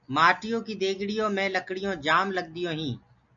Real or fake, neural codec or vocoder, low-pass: real; none; 7.2 kHz